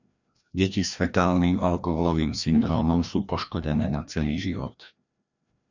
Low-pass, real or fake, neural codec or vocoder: 7.2 kHz; fake; codec, 16 kHz, 1 kbps, FreqCodec, larger model